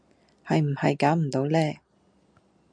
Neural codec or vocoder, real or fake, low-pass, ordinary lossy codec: none; real; 9.9 kHz; MP3, 96 kbps